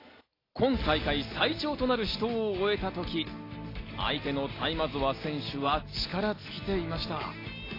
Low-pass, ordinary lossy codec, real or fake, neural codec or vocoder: 5.4 kHz; AAC, 24 kbps; real; none